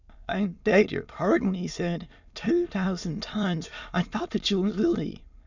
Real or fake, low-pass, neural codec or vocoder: fake; 7.2 kHz; autoencoder, 22.05 kHz, a latent of 192 numbers a frame, VITS, trained on many speakers